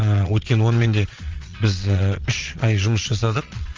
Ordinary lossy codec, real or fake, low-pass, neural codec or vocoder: Opus, 32 kbps; fake; 7.2 kHz; vocoder, 22.05 kHz, 80 mel bands, Vocos